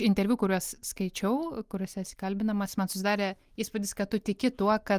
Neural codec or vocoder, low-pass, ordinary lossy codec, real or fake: none; 14.4 kHz; Opus, 32 kbps; real